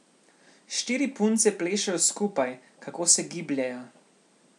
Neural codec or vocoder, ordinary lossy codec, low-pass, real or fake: none; none; 10.8 kHz; real